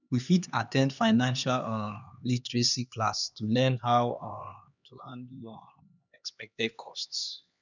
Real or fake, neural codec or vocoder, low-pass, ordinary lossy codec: fake; codec, 16 kHz, 2 kbps, X-Codec, HuBERT features, trained on LibriSpeech; 7.2 kHz; none